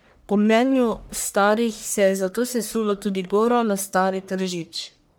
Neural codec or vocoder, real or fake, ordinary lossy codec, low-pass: codec, 44.1 kHz, 1.7 kbps, Pupu-Codec; fake; none; none